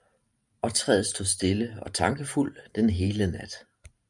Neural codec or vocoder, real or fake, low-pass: vocoder, 44.1 kHz, 128 mel bands every 512 samples, BigVGAN v2; fake; 10.8 kHz